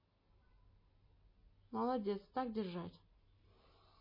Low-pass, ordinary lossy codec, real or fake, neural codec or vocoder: 5.4 kHz; MP3, 24 kbps; real; none